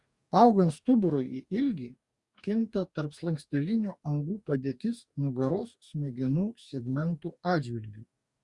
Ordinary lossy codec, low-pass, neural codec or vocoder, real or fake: Opus, 64 kbps; 10.8 kHz; codec, 44.1 kHz, 2.6 kbps, DAC; fake